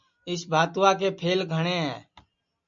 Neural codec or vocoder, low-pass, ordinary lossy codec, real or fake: none; 7.2 kHz; MP3, 48 kbps; real